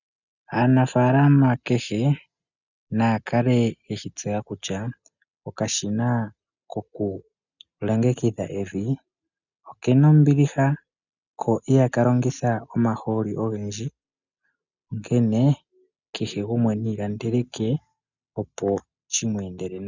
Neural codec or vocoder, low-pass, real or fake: none; 7.2 kHz; real